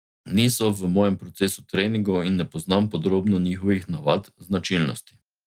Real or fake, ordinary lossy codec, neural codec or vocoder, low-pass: real; Opus, 32 kbps; none; 19.8 kHz